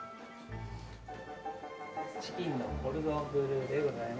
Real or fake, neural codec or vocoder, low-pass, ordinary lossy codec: real; none; none; none